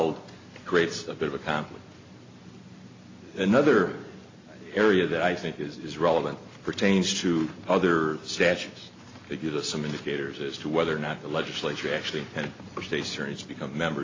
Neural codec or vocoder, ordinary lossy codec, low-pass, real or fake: none; AAC, 32 kbps; 7.2 kHz; real